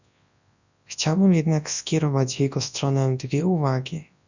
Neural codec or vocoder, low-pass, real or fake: codec, 24 kHz, 0.9 kbps, WavTokenizer, large speech release; 7.2 kHz; fake